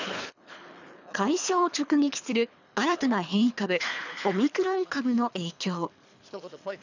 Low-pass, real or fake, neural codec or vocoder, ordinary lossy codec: 7.2 kHz; fake; codec, 24 kHz, 3 kbps, HILCodec; none